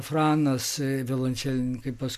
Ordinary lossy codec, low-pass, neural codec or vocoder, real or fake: MP3, 96 kbps; 14.4 kHz; none; real